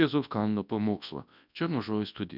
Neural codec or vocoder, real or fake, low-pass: codec, 24 kHz, 0.9 kbps, WavTokenizer, large speech release; fake; 5.4 kHz